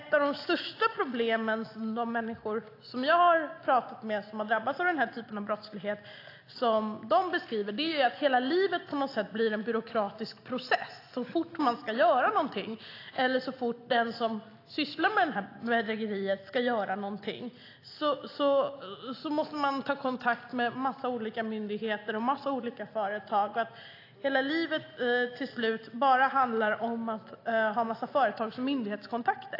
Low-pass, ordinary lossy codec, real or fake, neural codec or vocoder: 5.4 kHz; AAC, 32 kbps; real; none